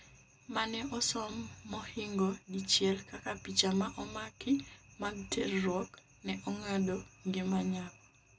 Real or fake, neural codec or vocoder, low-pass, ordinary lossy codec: real; none; none; none